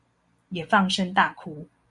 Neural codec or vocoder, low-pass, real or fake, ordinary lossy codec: none; 10.8 kHz; real; MP3, 64 kbps